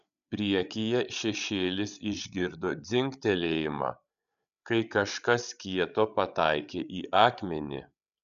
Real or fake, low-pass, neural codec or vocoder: fake; 7.2 kHz; codec, 16 kHz, 8 kbps, FreqCodec, larger model